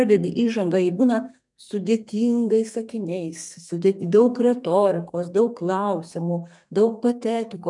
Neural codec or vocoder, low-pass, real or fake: codec, 32 kHz, 1.9 kbps, SNAC; 10.8 kHz; fake